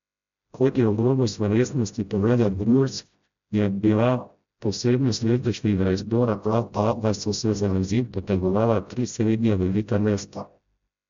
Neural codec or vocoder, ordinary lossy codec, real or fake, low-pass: codec, 16 kHz, 0.5 kbps, FreqCodec, smaller model; MP3, 64 kbps; fake; 7.2 kHz